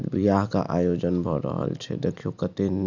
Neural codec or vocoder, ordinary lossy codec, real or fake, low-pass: none; none; real; 7.2 kHz